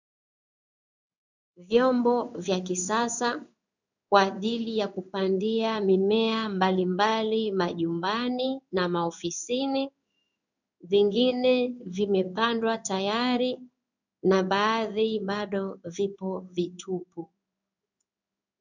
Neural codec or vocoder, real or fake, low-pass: codec, 16 kHz in and 24 kHz out, 1 kbps, XY-Tokenizer; fake; 7.2 kHz